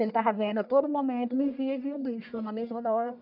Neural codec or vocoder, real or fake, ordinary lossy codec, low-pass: codec, 44.1 kHz, 1.7 kbps, Pupu-Codec; fake; none; 5.4 kHz